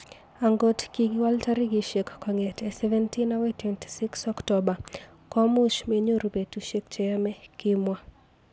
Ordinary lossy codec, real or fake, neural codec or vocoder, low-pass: none; real; none; none